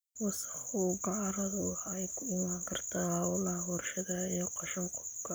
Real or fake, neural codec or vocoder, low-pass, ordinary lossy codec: real; none; none; none